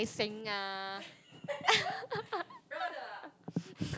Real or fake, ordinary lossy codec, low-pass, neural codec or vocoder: real; none; none; none